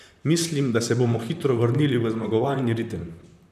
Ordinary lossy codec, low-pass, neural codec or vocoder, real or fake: none; 14.4 kHz; vocoder, 44.1 kHz, 128 mel bands, Pupu-Vocoder; fake